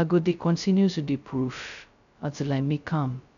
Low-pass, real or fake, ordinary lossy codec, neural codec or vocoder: 7.2 kHz; fake; none; codec, 16 kHz, 0.2 kbps, FocalCodec